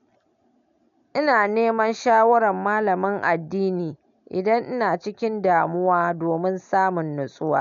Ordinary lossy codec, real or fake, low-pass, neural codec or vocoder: none; real; 7.2 kHz; none